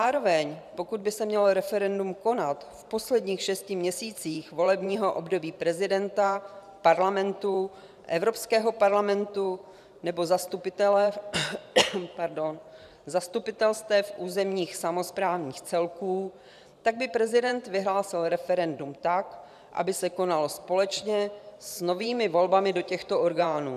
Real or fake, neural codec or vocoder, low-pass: fake; vocoder, 44.1 kHz, 128 mel bands every 512 samples, BigVGAN v2; 14.4 kHz